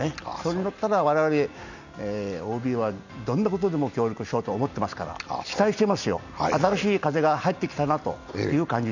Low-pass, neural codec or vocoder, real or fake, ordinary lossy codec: 7.2 kHz; none; real; none